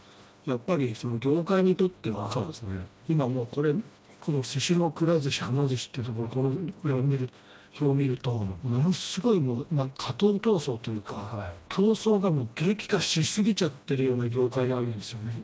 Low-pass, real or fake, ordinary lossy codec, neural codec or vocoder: none; fake; none; codec, 16 kHz, 1 kbps, FreqCodec, smaller model